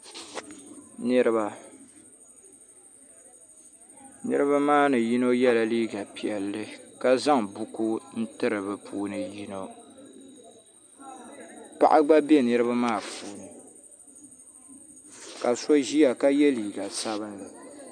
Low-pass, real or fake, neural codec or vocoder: 9.9 kHz; real; none